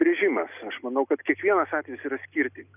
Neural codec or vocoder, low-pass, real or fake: none; 3.6 kHz; real